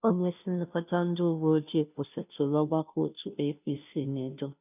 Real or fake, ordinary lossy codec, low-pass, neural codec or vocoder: fake; none; 3.6 kHz; codec, 16 kHz, 0.5 kbps, FunCodec, trained on Chinese and English, 25 frames a second